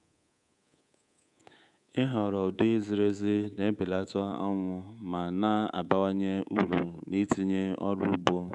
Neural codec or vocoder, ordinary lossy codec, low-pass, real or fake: codec, 24 kHz, 3.1 kbps, DualCodec; none; 10.8 kHz; fake